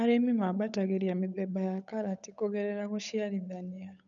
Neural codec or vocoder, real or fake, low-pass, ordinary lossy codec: codec, 16 kHz, 8 kbps, FunCodec, trained on Chinese and English, 25 frames a second; fake; 7.2 kHz; none